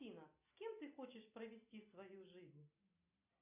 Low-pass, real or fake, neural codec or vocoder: 3.6 kHz; real; none